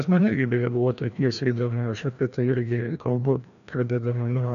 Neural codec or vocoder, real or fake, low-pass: codec, 16 kHz, 1 kbps, FreqCodec, larger model; fake; 7.2 kHz